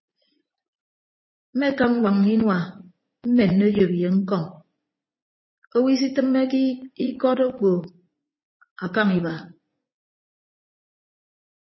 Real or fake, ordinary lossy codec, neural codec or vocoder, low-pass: fake; MP3, 24 kbps; vocoder, 44.1 kHz, 80 mel bands, Vocos; 7.2 kHz